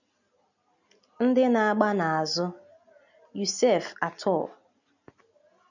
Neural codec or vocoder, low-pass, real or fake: none; 7.2 kHz; real